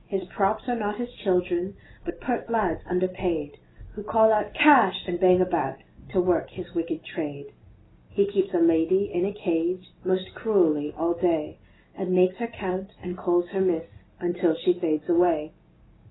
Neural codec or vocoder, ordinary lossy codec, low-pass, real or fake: none; AAC, 16 kbps; 7.2 kHz; real